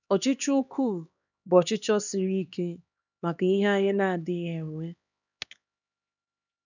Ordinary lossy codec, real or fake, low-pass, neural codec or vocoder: none; fake; 7.2 kHz; codec, 16 kHz, 2 kbps, X-Codec, HuBERT features, trained on LibriSpeech